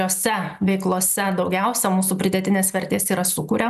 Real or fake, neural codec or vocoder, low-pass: real; none; 14.4 kHz